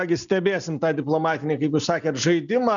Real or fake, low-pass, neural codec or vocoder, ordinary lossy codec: real; 7.2 kHz; none; MP3, 96 kbps